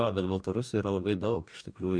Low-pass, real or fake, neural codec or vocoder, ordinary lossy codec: 9.9 kHz; fake; codec, 44.1 kHz, 2.6 kbps, DAC; MP3, 96 kbps